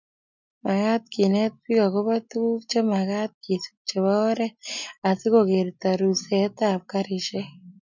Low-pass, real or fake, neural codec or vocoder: 7.2 kHz; real; none